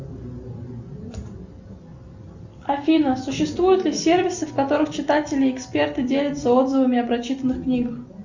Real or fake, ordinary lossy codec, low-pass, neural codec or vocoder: real; Opus, 64 kbps; 7.2 kHz; none